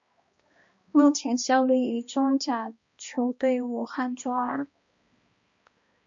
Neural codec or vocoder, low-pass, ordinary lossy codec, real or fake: codec, 16 kHz, 1 kbps, X-Codec, HuBERT features, trained on balanced general audio; 7.2 kHz; MP3, 48 kbps; fake